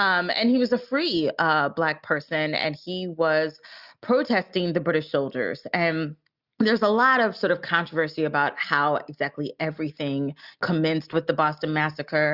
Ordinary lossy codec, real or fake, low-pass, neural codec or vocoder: Opus, 64 kbps; real; 5.4 kHz; none